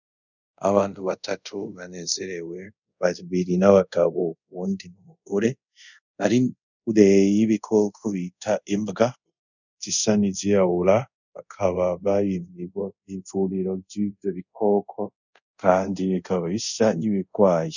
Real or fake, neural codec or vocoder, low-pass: fake; codec, 24 kHz, 0.5 kbps, DualCodec; 7.2 kHz